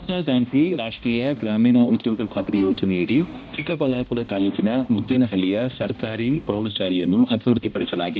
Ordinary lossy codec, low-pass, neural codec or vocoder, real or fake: none; none; codec, 16 kHz, 1 kbps, X-Codec, HuBERT features, trained on balanced general audio; fake